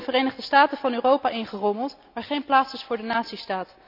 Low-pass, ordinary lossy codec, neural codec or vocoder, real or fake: 5.4 kHz; none; none; real